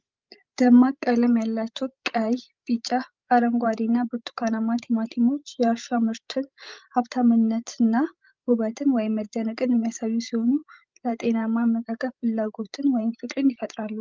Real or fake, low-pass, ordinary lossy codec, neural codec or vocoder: fake; 7.2 kHz; Opus, 32 kbps; codec, 16 kHz, 16 kbps, FreqCodec, larger model